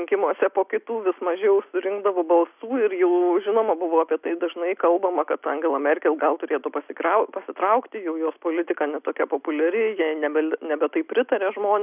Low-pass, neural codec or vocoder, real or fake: 3.6 kHz; none; real